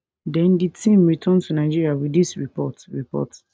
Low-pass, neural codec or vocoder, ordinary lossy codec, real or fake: none; none; none; real